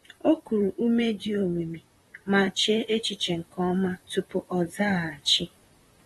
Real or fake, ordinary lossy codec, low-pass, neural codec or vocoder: fake; AAC, 32 kbps; 19.8 kHz; vocoder, 44.1 kHz, 128 mel bands, Pupu-Vocoder